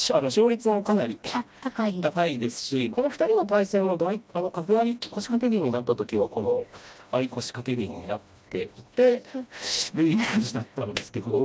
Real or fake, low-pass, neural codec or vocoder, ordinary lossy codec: fake; none; codec, 16 kHz, 1 kbps, FreqCodec, smaller model; none